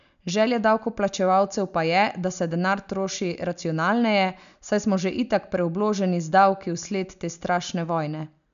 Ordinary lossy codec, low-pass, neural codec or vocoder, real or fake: none; 7.2 kHz; none; real